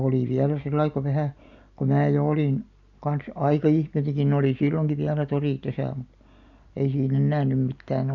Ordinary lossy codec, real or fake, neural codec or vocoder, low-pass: none; real; none; 7.2 kHz